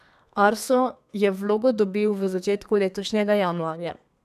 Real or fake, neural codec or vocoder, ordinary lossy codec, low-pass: fake; codec, 32 kHz, 1.9 kbps, SNAC; none; 14.4 kHz